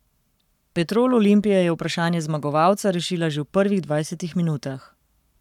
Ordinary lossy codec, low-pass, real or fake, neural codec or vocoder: none; 19.8 kHz; fake; codec, 44.1 kHz, 7.8 kbps, Pupu-Codec